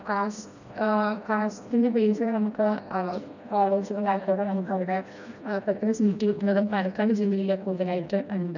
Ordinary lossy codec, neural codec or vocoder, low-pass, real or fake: none; codec, 16 kHz, 1 kbps, FreqCodec, smaller model; 7.2 kHz; fake